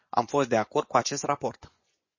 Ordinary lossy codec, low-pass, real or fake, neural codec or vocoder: MP3, 32 kbps; 7.2 kHz; fake; vocoder, 24 kHz, 100 mel bands, Vocos